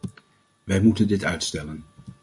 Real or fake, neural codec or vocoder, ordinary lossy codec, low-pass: real; none; MP3, 64 kbps; 10.8 kHz